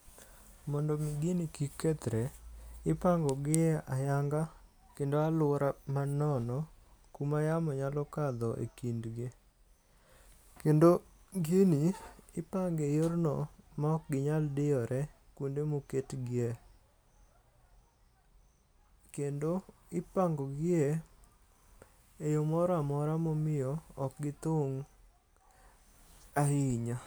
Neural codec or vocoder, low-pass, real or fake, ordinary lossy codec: none; none; real; none